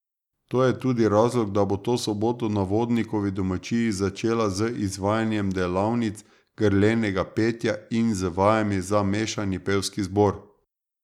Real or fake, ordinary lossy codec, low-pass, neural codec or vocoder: real; none; 19.8 kHz; none